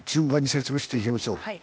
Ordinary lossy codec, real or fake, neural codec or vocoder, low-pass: none; fake; codec, 16 kHz, 0.8 kbps, ZipCodec; none